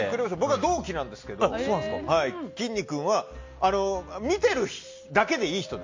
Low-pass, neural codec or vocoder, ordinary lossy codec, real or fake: 7.2 kHz; none; MP3, 48 kbps; real